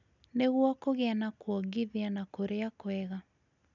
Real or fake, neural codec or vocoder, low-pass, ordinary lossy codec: real; none; 7.2 kHz; none